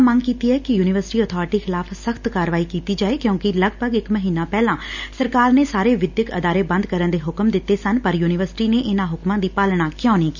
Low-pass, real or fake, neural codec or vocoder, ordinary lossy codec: 7.2 kHz; real; none; none